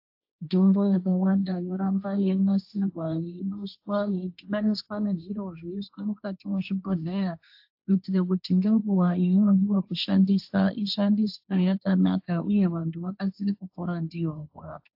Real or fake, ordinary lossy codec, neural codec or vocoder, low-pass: fake; AAC, 48 kbps; codec, 16 kHz, 1.1 kbps, Voila-Tokenizer; 5.4 kHz